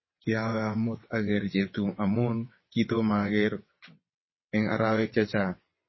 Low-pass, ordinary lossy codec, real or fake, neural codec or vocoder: 7.2 kHz; MP3, 24 kbps; fake; vocoder, 22.05 kHz, 80 mel bands, WaveNeXt